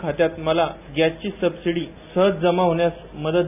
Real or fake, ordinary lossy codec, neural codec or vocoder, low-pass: real; none; none; 3.6 kHz